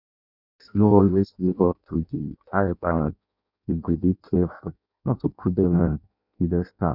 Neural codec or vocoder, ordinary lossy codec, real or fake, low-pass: codec, 16 kHz in and 24 kHz out, 0.6 kbps, FireRedTTS-2 codec; none; fake; 5.4 kHz